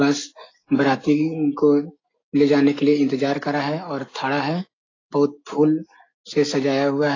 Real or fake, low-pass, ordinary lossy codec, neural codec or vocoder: real; 7.2 kHz; AAC, 32 kbps; none